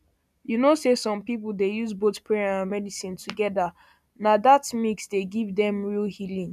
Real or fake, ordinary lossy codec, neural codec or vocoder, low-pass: real; none; none; 14.4 kHz